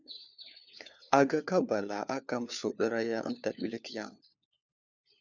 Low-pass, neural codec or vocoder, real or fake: 7.2 kHz; codec, 16 kHz, 4 kbps, FunCodec, trained on LibriTTS, 50 frames a second; fake